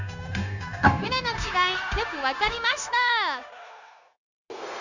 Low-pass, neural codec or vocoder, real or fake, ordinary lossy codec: 7.2 kHz; codec, 16 kHz, 0.9 kbps, LongCat-Audio-Codec; fake; none